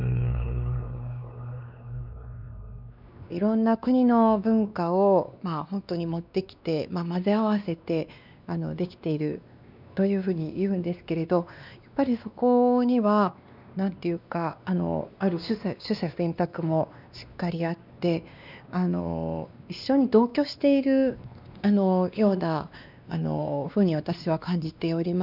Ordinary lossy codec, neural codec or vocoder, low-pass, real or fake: none; codec, 16 kHz, 2 kbps, X-Codec, WavLM features, trained on Multilingual LibriSpeech; 5.4 kHz; fake